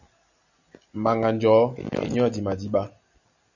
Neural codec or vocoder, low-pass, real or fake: none; 7.2 kHz; real